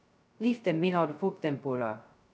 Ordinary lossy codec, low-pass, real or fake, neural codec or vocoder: none; none; fake; codec, 16 kHz, 0.2 kbps, FocalCodec